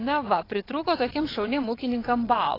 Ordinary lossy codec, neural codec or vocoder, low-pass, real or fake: AAC, 24 kbps; vocoder, 22.05 kHz, 80 mel bands, WaveNeXt; 5.4 kHz; fake